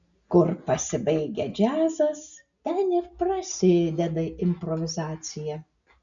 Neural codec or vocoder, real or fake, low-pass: none; real; 7.2 kHz